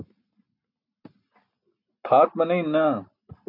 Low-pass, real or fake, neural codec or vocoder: 5.4 kHz; real; none